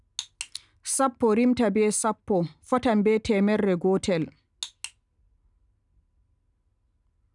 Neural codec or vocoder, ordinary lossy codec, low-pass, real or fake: none; none; 10.8 kHz; real